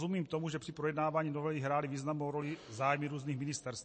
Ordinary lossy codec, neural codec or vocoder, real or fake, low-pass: MP3, 32 kbps; none; real; 9.9 kHz